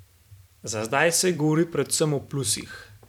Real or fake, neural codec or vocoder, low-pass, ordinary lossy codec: fake; vocoder, 44.1 kHz, 128 mel bands every 512 samples, BigVGAN v2; 19.8 kHz; none